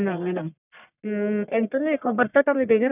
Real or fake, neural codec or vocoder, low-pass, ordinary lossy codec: fake; codec, 44.1 kHz, 1.7 kbps, Pupu-Codec; 3.6 kHz; none